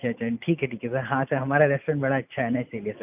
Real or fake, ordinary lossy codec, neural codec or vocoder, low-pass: real; none; none; 3.6 kHz